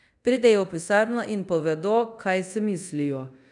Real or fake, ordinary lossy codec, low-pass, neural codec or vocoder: fake; none; 10.8 kHz; codec, 24 kHz, 0.5 kbps, DualCodec